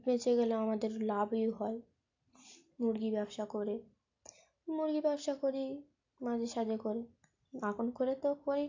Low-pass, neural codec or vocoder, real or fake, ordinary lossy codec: 7.2 kHz; none; real; AAC, 48 kbps